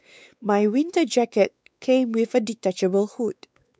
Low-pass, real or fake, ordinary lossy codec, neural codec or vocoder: none; fake; none; codec, 16 kHz, 4 kbps, X-Codec, WavLM features, trained on Multilingual LibriSpeech